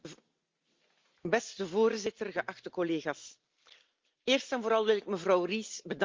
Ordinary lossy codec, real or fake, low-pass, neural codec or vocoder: Opus, 32 kbps; real; 7.2 kHz; none